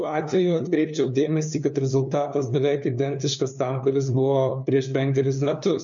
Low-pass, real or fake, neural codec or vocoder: 7.2 kHz; fake; codec, 16 kHz, 2 kbps, FunCodec, trained on LibriTTS, 25 frames a second